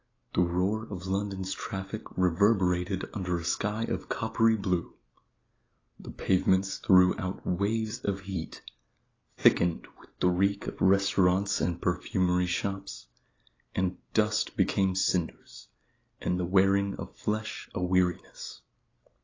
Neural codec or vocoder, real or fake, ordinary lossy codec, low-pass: none; real; AAC, 32 kbps; 7.2 kHz